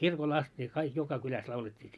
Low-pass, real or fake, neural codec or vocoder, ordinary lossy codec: none; real; none; none